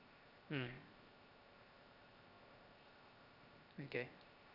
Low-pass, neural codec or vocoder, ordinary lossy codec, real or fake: 5.4 kHz; codec, 16 kHz, 0.7 kbps, FocalCodec; none; fake